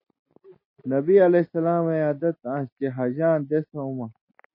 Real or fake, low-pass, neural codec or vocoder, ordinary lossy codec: real; 5.4 kHz; none; MP3, 24 kbps